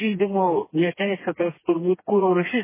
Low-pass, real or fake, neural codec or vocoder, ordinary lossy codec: 3.6 kHz; fake; codec, 16 kHz, 2 kbps, FreqCodec, smaller model; MP3, 16 kbps